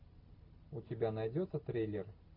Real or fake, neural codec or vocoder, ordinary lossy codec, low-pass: real; none; AAC, 48 kbps; 5.4 kHz